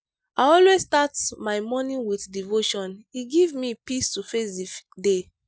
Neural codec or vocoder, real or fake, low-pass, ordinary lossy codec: none; real; none; none